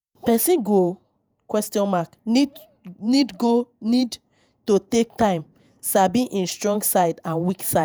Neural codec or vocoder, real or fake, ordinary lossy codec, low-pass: vocoder, 48 kHz, 128 mel bands, Vocos; fake; none; none